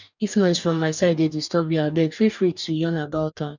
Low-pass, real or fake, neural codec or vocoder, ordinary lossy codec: 7.2 kHz; fake; codec, 44.1 kHz, 2.6 kbps, DAC; none